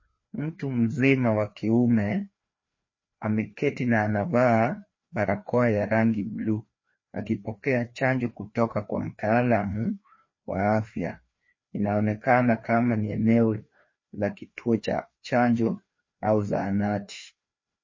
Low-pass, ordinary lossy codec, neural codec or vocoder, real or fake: 7.2 kHz; MP3, 32 kbps; codec, 16 kHz, 2 kbps, FreqCodec, larger model; fake